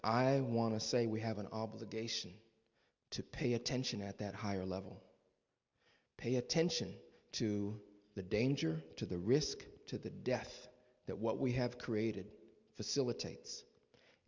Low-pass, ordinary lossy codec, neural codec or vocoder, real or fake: 7.2 kHz; MP3, 64 kbps; none; real